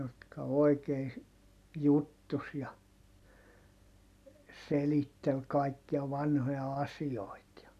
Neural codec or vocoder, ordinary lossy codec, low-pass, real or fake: none; none; 14.4 kHz; real